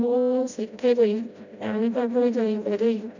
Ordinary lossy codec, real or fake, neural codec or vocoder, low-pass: none; fake; codec, 16 kHz, 0.5 kbps, FreqCodec, smaller model; 7.2 kHz